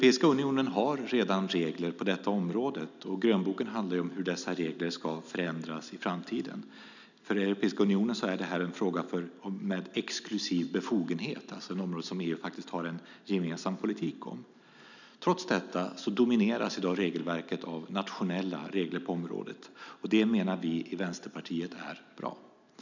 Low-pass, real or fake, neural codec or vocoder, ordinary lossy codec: 7.2 kHz; real; none; none